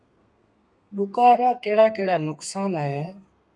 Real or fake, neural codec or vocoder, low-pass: fake; codec, 32 kHz, 1.9 kbps, SNAC; 10.8 kHz